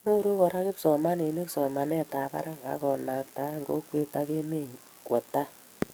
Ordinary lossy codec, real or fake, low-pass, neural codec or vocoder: none; fake; none; codec, 44.1 kHz, 7.8 kbps, DAC